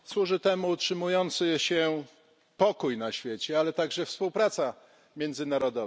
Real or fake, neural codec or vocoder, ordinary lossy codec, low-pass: real; none; none; none